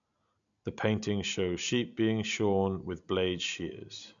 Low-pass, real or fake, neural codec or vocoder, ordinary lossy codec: 7.2 kHz; real; none; none